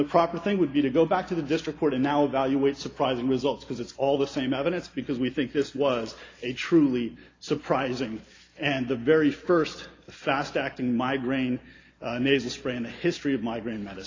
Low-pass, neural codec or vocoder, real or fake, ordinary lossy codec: 7.2 kHz; none; real; AAC, 32 kbps